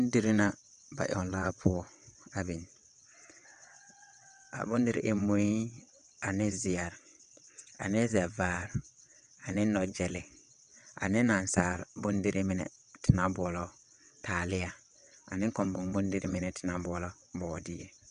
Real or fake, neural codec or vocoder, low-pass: fake; vocoder, 22.05 kHz, 80 mel bands, WaveNeXt; 9.9 kHz